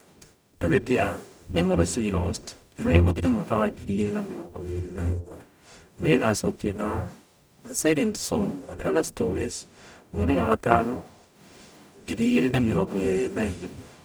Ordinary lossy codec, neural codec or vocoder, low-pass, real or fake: none; codec, 44.1 kHz, 0.9 kbps, DAC; none; fake